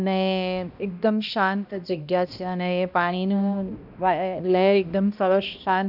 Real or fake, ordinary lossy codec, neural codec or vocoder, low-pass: fake; none; codec, 16 kHz, 1 kbps, X-Codec, HuBERT features, trained on balanced general audio; 5.4 kHz